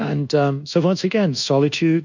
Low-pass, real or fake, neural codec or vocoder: 7.2 kHz; fake; codec, 24 kHz, 1.2 kbps, DualCodec